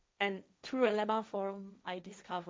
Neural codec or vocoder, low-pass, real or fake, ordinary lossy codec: codec, 16 kHz, 1.1 kbps, Voila-Tokenizer; none; fake; none